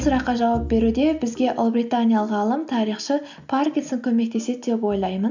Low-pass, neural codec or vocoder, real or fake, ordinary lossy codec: 7.2 kHz; none; real; none